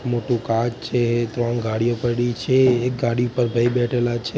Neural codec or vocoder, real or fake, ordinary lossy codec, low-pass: none; real; none; none